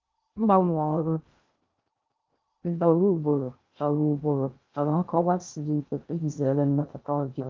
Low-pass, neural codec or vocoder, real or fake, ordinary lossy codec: 7.2 kHz; codec, 16 kHz in and 24 kHz out, 0.6 kbps, FocalCodec, streaming, 2048 codes; fake; Opus, 24 kbps